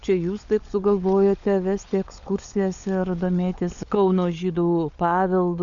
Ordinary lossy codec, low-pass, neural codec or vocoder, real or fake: Opus, 64 kbps; 7.2 kHz; codec, 16 kHz, 16 kbps, FunCodec, trained on LibriTTS, 50 frames a second; fake